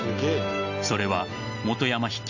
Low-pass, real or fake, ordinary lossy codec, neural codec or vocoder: 7.2 kHz; real; none; none